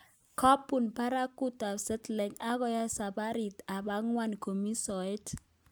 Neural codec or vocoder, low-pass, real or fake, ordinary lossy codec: none; none; real; none